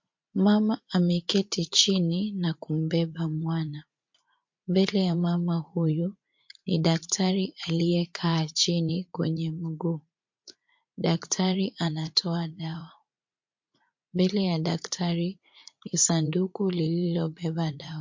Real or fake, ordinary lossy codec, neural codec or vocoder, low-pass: fake; MP3, 48 kbps; vocoder, 44.1 kHz, 80 mel bands, Vocos; 7.2 kHz